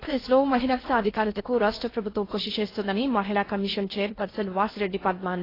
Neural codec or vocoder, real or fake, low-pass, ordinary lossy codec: codec, 16 kHz in and 24 kHz out, 0.6 kbps, FocalCodec, streaming, 4096 codes; fake; 5.4 kHz; AAC, 24 kbps